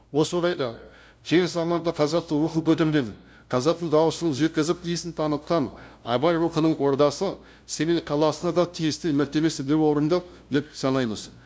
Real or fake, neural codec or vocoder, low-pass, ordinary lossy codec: fake; codec, 16 kHz, 0.5 kbps, FunCodec, trained on LibriTTS, 25 frames a second; none; none